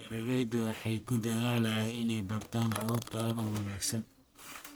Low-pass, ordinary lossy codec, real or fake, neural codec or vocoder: none; none; fake; codec, 44.1 kHz, 1.7 kbps, Pupu-Codec